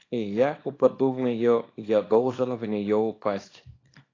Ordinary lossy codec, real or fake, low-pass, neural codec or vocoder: AAC, 32 kbps; fake; 7.2 kHz; codec, 24 kHz, 0.9 kbps, WavTokenizer, small release